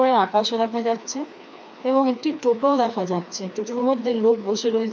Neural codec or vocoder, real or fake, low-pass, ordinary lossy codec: codec, 16 kHz, 2 kbps, FreqCodec, larger model; fake; none; none